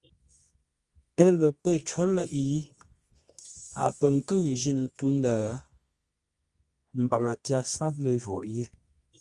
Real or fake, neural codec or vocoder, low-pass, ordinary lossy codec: fake; codec, 24 kHz, 0.9 kbps, WavTokenizer, medium music audio release; 10.8 kHz; Opus, 32 kbps